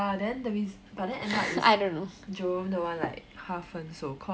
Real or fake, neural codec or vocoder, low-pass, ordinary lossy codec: real; none; none; none